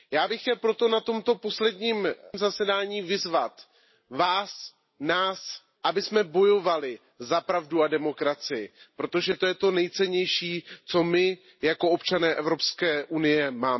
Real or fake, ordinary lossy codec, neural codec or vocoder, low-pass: real; MP3, 24 kbps; none; 7.2 kHz